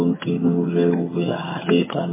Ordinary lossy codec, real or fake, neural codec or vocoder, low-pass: MP3, 16 kbps; fake; vocoder, 22.05 kHz, 80 mel bands, HiFi-GAN; 3.6 kHz